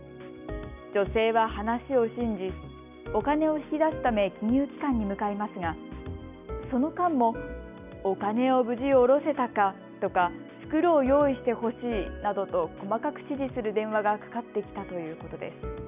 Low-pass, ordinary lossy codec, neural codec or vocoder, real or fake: 3.6 kHz; none; none; real